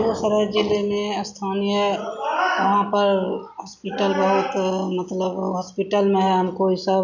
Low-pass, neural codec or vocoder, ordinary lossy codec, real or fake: 7.2 kHz; none; none; real